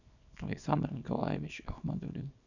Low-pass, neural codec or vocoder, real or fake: 7.2 kHz; codec, 24 kHz, 0.9 kbps, WavTokenizer, small release; fake